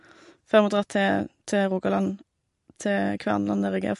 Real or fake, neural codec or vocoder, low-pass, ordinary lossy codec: real; none; 14.4 kHz; MP3, 48 kbps